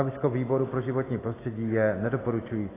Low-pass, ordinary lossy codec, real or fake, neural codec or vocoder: 3.6 kHz; AAC, 16 kbps; real; none